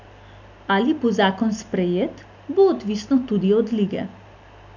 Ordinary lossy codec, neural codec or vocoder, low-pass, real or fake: none; none; 7.2 kHz; real